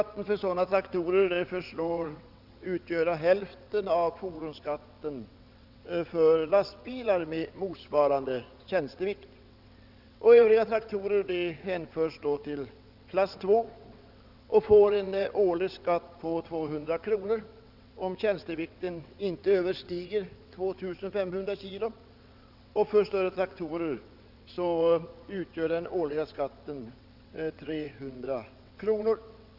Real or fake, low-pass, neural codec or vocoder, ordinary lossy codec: fake; 5.4 kHz; vocoder, 22.05 kHz, 80 mel bands, WaveNeXt; none